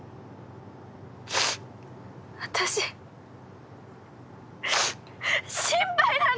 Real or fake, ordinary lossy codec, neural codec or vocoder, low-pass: real; none; none; none